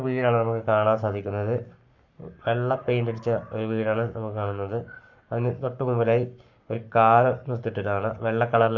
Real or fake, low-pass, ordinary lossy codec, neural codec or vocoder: fake; 7.2 kHz; none; codec, 44.1 kHz, 7.8 kbps, Pupu-Codec